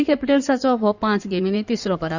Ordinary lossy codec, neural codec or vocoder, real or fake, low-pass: none; codec, 16 kHz in and 24 kHz out, 2.2 kbps, FireRedTTS-2 codec; fake; 7.2 kHz